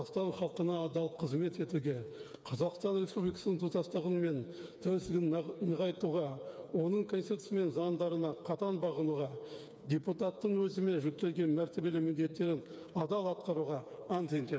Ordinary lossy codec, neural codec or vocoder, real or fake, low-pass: none; codec, 16 kHz, 4 kbps, FreqCodec, smaller model; fake; none